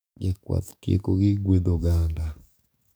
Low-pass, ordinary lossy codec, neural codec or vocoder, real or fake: none; none; codec, 44.1 kHz, 7.8 kbps, DAC; fake